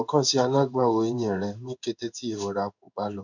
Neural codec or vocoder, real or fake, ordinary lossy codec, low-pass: codec, 16 kHz in and 24 kHz out, 1 kbps, XY-Tokenizer; fake; none; 7.2 kHz